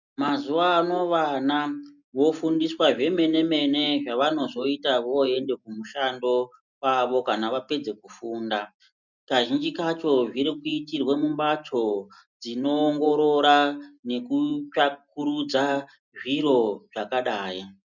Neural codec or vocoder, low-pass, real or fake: none; 7.2 kHz; real